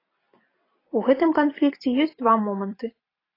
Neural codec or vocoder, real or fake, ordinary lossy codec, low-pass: vocoder, 44.1 kHz, 128 mel bands every 256 samples, BigVGAN v2; fake; AAC, 24 kbps; 5.4 kHz